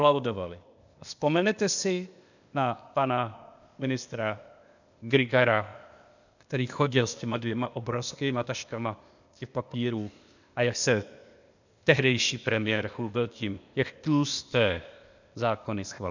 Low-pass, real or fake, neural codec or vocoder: 7.2 kHz; fake; codec, 16 kHz, 0.8 kbps, ZipCodec